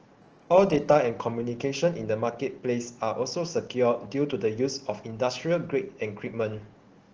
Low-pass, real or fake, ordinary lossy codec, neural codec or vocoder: 7.2 kHz; real; Opus, 16 kbps; none